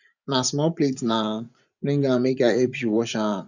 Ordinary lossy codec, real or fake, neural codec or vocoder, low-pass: none; fake; vocoder, 24 kHz, 100 mel bands, Vocos; 7.2 kHz